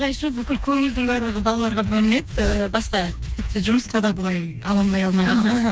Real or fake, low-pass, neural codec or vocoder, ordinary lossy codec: fake; none; codec, 16 kHz, 2 kbps, FreqCodec, smaller model; none